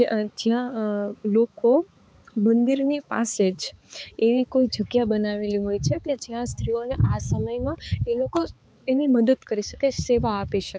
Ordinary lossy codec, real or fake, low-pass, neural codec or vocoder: none; fake; none; codec, 16 kHz, 4 kbps, X-Codec, HuBERT features, trained on balanced general audio